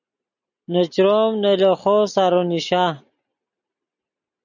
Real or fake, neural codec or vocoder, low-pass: real; none; 7.2 kHz